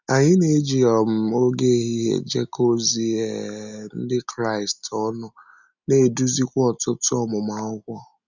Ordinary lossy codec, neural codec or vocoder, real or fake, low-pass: none; none; real; 7.2 kHz